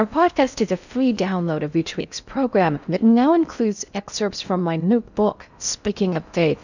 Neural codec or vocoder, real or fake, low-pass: codec, 16 kHz in and 24 kHz out, 0.6 kbps, FocalCodec, streaming, 4096 codes; fake; 7.2 kHz